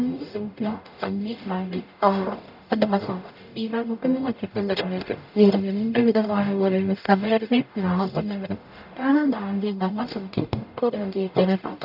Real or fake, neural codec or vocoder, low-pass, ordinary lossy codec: fake; codec, 44.1 kHz, 0.9 kbps, DAC; 5.4 kHz; none